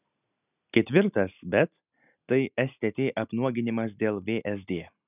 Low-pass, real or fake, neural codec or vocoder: 3.6 kHz; real; none